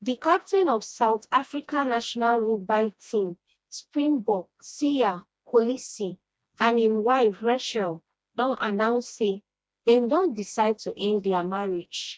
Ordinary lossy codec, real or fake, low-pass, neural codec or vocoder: none; fake; none; codec, 16 kHz, 1 kbps, FreqCodec, smaller model